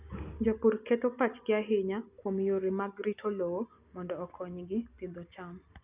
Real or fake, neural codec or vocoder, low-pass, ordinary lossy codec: real; none; 3.6 kHz; Opus, 64 kbps